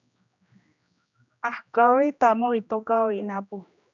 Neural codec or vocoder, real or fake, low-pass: codec, 16 kHz, 1 kbps, X-Codec, HuBERT features, trained on general audio; fake; 7.2 kHz